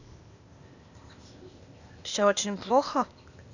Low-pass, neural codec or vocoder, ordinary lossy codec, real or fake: 7.2 kHz; codec, 16 kHz, 0.8 kbps, ZipCodec; none; fake